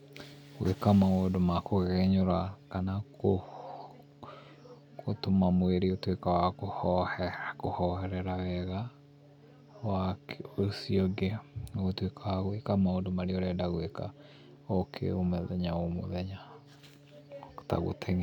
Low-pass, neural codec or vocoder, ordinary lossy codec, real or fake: 19.8 kHz; none; none; real